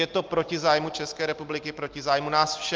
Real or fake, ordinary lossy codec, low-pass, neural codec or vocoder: real; Opus, 32 kbps; 7.2 kHz; none